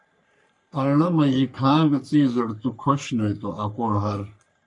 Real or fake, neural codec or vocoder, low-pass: fake; codec, 44.1 kHz, 3.4 kbps, Pupu-Codec; 10.8 kHz